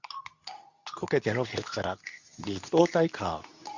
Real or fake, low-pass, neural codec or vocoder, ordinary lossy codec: fake; 7.2 kHz; codec, 24 kHz, 0.9 kbps, WavTokenizer, medium speech release version 2; none